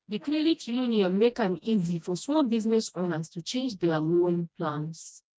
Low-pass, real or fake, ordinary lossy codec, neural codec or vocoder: none; fake; none; codec, 16 kHz, 1 kbps, FreqCodec, smaller model